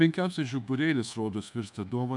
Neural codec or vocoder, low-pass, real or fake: codec, 24 kHz, 1.2 kbps, DualCodec; 10.8 kHz; fake